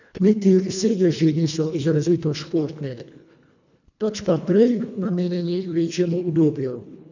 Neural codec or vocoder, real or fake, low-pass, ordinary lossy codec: codec, 24 kHz, 1.5 kbps, HILCodec; fake; 7.2 kHz; none